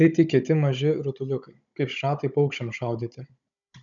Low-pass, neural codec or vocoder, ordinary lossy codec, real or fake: 7.2 kHz; codec, 16 kHz, 16 kbps, FunCodec, trained on Chinese and English, 50 frames a second; MP3, 96 kbps; fake